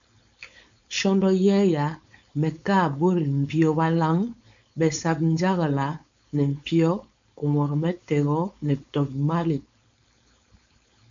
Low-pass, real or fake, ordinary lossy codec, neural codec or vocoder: 7.2 kHz; fake; MP3, 64 kbps; codec, 16 kHz, 4.8 kbps, FACodec